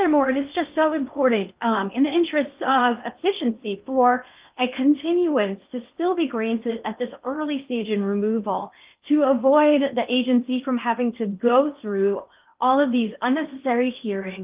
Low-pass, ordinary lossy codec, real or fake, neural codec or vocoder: 3.6 kHz; Opus, 24 kbps; fake; codec, 16 kHz in and 24 kHz out, 0.8 kbps, FocalCodec, streaming, 65536 codes